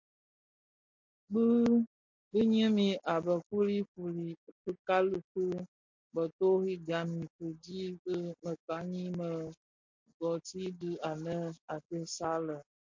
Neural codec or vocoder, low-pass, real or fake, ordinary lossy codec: none; 7.2 kHz; real; MP3, 48 kbps